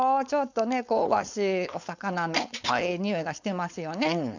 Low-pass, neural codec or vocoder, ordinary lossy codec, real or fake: 7.2 kHz; codec, 16 kHz, 4.8 kbps, FACodec; none; fake